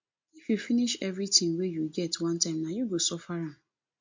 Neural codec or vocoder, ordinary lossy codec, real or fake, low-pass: none; MP3, 48 kbps; real; 7.2 kHz